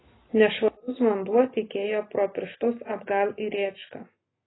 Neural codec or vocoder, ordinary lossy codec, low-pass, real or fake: none; AAC, 16 kbps; 7.2 kHz; real